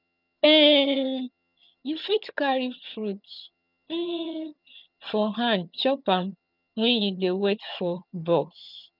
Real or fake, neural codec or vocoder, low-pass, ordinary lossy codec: fake; vocoder, 22.05 kHz, 80 mel bands, HiFi-GAN; 5.4 kHz; none